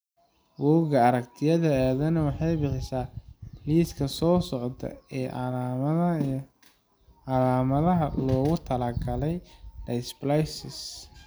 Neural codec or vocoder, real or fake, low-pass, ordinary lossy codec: none; real; none; none